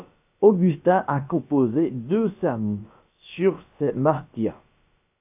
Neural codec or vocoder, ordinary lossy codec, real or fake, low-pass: codec, 16 kHz, about 1 kbps, DyCAST, with the encoder's durations; AAC, 32 kbps; fake; 3.6 kHz